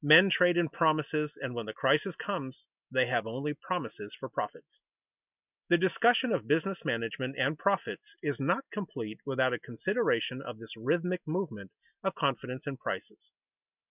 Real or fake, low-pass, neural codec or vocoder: real; 3.6 kHz; none